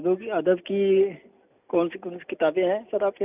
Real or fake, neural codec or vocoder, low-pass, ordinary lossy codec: real; none; 3.6 kHz; none